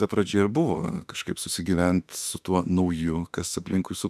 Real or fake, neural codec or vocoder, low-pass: fake; autoencoder, 48 kHz, 32 numbers a frame, DAC-VAE, trained on Japanese speech; 14.4 kHz